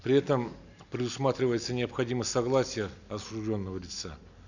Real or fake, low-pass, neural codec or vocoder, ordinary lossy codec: real; 7.2 kHz; none; none